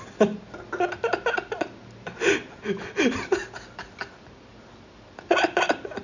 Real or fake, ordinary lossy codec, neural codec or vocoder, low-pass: real; none; none; 7.2 kHz